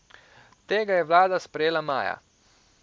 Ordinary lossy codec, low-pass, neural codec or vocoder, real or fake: none; none; none; real